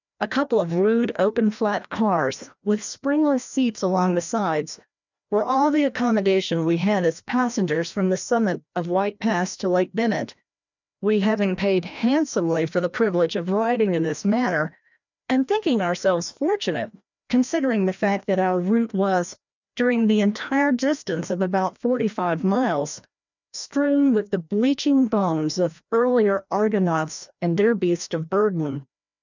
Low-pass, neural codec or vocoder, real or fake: 7.2 kHz; codec, 16 kHz, 1 kbps, FreqCodec, larger model; fake